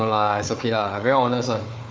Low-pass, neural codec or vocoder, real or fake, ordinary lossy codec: none; codec, 16 kHz, 4 kbps, FunCodec, trained on Chinese and English, 50 frames a second; fake; none